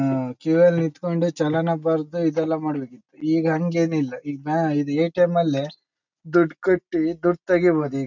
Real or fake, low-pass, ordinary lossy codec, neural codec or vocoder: real; 7.2 kHz; none; none